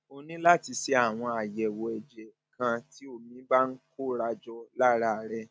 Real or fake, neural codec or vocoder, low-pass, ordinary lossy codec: real; none; none; none